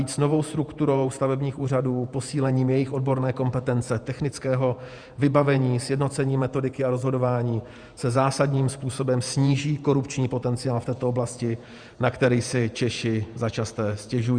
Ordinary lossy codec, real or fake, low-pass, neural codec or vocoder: Opus, 64 kbps; fake; 9.9 kHz; vocoder, 48 kHz, 128 mel bands, Vocos